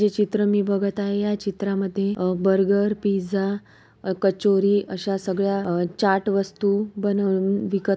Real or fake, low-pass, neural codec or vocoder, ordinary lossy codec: real; none; none; none